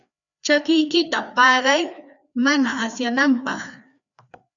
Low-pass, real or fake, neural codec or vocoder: 7.2 kHz; fake; codec, 16 kHz, 2 kbps, FreqCodec, larger model